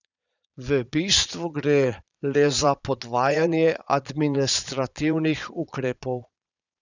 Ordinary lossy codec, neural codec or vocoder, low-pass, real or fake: none; vocoder, 22.05 kHz, 80 mel bands, Vocos; 7.2 kHz; fake